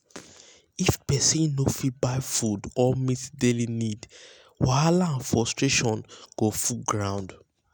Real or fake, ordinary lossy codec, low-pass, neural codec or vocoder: real; none; none; none